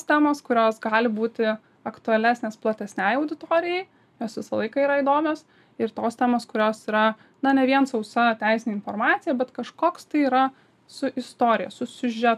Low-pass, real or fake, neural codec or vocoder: 14.4 kHz; real; none